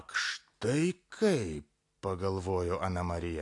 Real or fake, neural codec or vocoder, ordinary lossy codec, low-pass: real; none; AAC, 48 kbps; 10.8 kHz